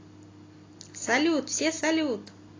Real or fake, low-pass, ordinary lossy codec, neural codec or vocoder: real; 7.2 kHz; AAC, 32 kbps; none